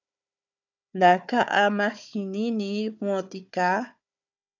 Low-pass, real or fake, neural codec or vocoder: 7.2 kHz; fake; codec, 16 kHz, 4 kbps, FunCodec, trained on Chinese and English, 50 frames a second